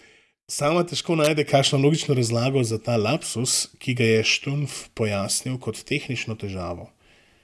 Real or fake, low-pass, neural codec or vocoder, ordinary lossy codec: real; none; none; none